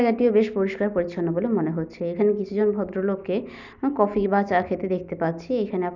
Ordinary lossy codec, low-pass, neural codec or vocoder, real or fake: none; 7.2 kHz; none; real